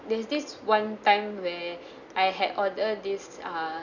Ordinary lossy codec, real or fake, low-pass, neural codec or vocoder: none; real; 7.2 kHz; none